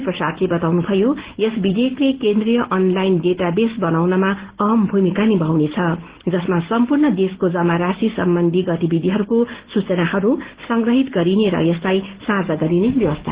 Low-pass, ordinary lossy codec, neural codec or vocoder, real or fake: 3.6 kHz; Opus, 16 kbps; none; real